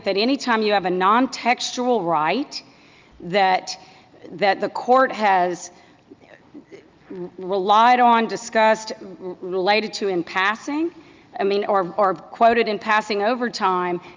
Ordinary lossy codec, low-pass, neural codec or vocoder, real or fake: Opus, 24 kbps; 7.2 kHz; none; real